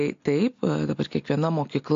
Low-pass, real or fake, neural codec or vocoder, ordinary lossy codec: 7.2 kHz; real; none; AAC, 48 kbps